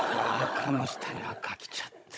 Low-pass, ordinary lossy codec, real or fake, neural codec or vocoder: none; none; fake; codec, 16 kHz, 16 kbps, FunCodec, trained on Chinese and English, 50 frames a second